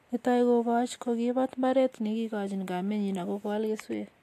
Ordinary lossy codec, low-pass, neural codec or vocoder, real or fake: AAC, 64 kbps; 14.4 kHz; none; real